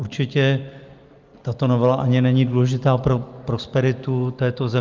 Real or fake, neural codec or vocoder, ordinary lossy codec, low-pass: real; none; Opus, 24 kbps; 7.2 kHz